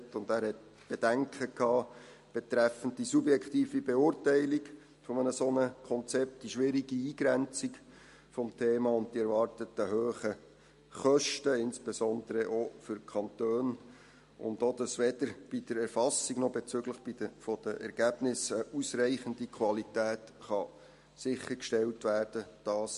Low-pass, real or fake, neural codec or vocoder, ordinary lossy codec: 14.4 kHz; real; none; MP3, 48 kbps